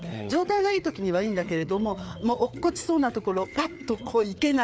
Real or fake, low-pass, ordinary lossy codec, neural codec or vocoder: fake; none; none; codec, 16 kHz, 4 kbps, FreqCodec, larger model